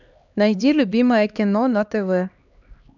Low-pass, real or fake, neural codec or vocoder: 7.2 kHz; fake; codec, 16 kHz, 2 kbps, X-Codec, HuBERT features, trained on LibriSpeech